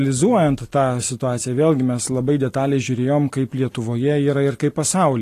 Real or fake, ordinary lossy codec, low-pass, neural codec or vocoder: real; AAC, 48 kbps; 14.4 kHz; none